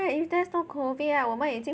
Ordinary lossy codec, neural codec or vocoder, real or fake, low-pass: none; none; real; none